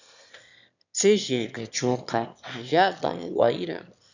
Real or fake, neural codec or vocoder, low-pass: fake; autoencoder, 22.05 kHz, a latent of 192 numbers a frame, VITS, trained on one speaker; 7.2 kHz